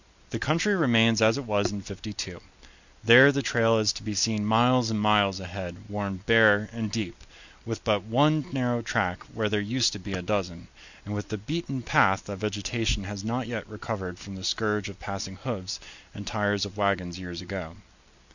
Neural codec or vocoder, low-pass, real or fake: none; 7.2 kHz; real